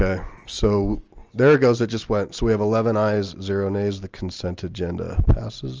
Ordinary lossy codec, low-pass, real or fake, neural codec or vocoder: Opus, 24 kbps; 7.2 kHz; real; none